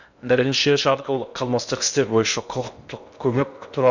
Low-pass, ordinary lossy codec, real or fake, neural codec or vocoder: 7.2 kHz; none; fake; codec, 16 kHz in and 24 kHz out, 0.6 kbps, FocalCodec, streaming, 2048 codes